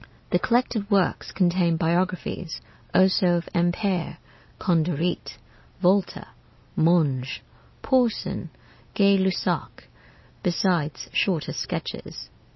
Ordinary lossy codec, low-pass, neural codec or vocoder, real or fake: MP3, 24 kbps; 7.2 kHz; none; real